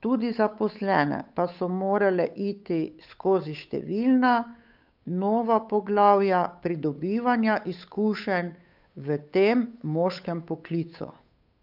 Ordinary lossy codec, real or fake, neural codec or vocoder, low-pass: none; fake; codec, 16 kHz, 8 kbps, FunCodec, trained on Chinese and English, 25 frames a second; 5.4 kHz